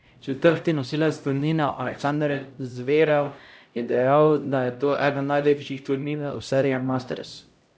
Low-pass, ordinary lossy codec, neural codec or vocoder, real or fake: none; none; codec, 16 kHz, 0.5 kbps, X-Codec, HuBERT features, trained on LibriSpeech; fake